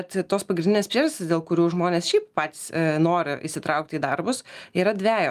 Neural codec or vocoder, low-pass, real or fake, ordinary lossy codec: none; 14.4 kHz; real; Opus, 32 kbps